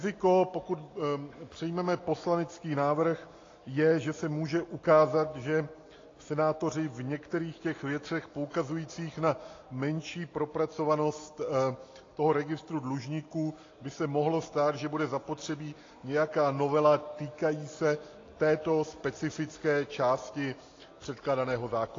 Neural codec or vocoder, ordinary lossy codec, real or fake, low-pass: none; AAC, 32 kbps; real; 7.2 kHz